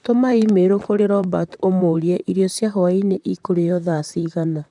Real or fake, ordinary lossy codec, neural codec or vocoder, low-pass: fake; none; vocoder, 44.1 kHz, 128 mel bands, Pupu-Vocoder; 10.8 kHz